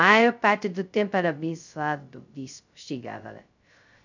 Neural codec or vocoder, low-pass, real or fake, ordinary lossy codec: codec, 16 kHz, 0.2 kbps, FocalCodec; 7.2 kHz; fake; none